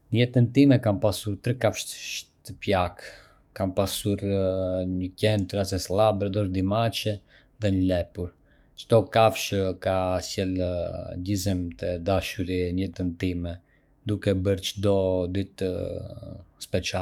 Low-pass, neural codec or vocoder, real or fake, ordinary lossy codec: 19.8 kHz; codec, 44.1 kHz, 7.8 kbps, DAC; fake; none